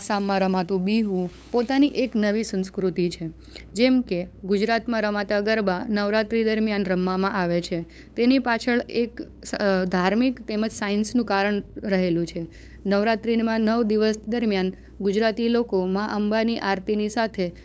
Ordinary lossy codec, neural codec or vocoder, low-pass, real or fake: none; codec, 16 kHz, 8 kbps, FunCodec, trained on LibriTTS, 25 frames a second; none; fake